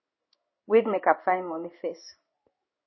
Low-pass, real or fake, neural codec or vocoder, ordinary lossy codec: 7.2 kHz; fake; codec, 16 kHz in and 24 kHz out, 1 kbps, XY-Tokenizer; MP3, 24 kbps